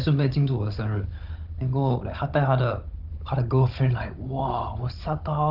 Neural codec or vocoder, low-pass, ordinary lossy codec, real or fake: codec, 16 kHz, 16 kbps, FunCodec, trained on Chinese and English, 50 frames a second; 5.4 kHz; Opus, 32 kbps; fake